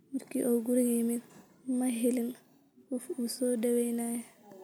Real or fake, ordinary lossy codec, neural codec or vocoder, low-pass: real; none; none; none